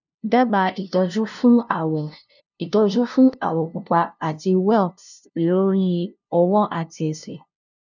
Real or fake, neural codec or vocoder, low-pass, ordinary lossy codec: fake; codec, 16 kHz, 0.5 kbps, FunCodec, trained on LibriTTS, 25 frames a second; 7.2 kHz; none